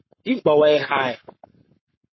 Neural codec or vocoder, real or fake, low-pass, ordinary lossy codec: codec, 44.1 kHz, 7.8 kbps, Pupu-Codec; fake; 7.2 kHz; MP3, 24 kbps